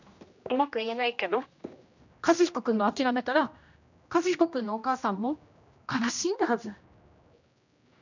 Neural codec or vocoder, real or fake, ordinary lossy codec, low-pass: codec, 16 kHz, 1 kbps, X-Codec, HuBERT features, trained on general audio; fake; none; 7.2 kHz